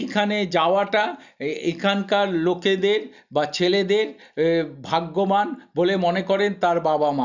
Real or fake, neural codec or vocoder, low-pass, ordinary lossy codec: real; none; 7.2 kHz; none